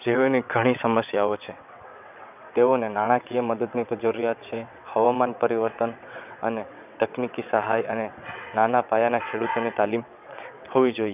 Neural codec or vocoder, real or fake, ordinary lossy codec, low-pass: vocoder, 22.05 kHz, 80 mel bands, WaveNeXt; fake; none; 3.6 kHz